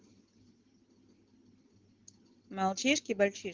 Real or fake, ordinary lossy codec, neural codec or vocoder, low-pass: real; Opus, 16 kbps; none; 7.2 kHz